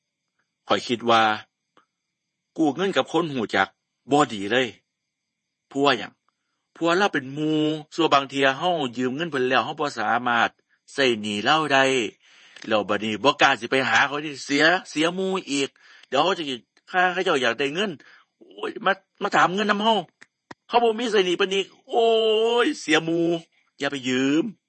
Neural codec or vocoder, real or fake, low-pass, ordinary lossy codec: vocoder, 48 kHz, 128 mel bands, Vocos; fake; 10.8 kHz; MP3, 32 kbps